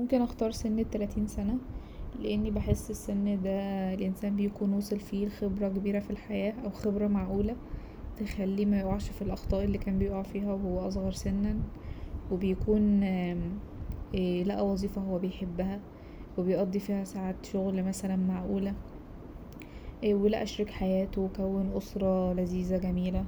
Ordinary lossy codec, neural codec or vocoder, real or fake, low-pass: none; none; real; none